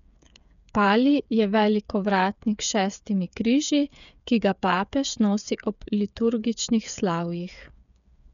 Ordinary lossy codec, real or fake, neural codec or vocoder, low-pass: none; fake; codec, 16 kHz, 8 kbps, FreqCodec, smaller model; 7.2 kHz